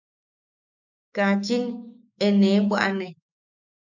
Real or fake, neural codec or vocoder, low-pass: fake; autoencoder, 48 kHz, 128 numbers a frame, DAC-VAE, trained on Japanese speech; 7.2 kHz